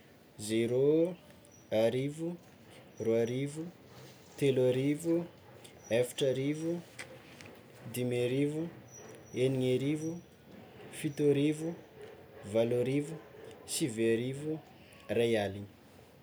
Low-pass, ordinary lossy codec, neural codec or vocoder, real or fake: none; none; none; real